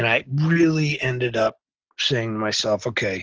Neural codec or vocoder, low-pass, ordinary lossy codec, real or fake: none; 7.2 kHz; Opus, 16 kbps; real